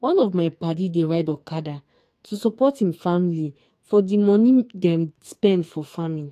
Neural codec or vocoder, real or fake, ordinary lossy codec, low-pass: codec, 44.1 kHz, 2.6 kbps, SNAC; fake; AAC, 64 kbps; 14.4 kHz